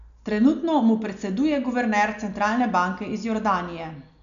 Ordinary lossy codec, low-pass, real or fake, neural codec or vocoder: none; 7.2 kHz; real; none